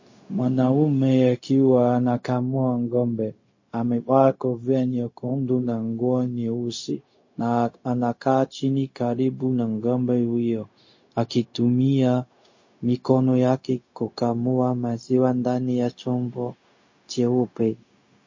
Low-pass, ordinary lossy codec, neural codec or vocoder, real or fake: 7.2 kHz; MP3, 32 kbps; codec, 16 kHz, 0.4 kbps, LongCat-Audio-Codec; fake